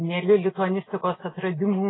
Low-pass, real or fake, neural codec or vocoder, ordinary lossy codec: 7.2 kHz; real; none; AAC, 16 kbps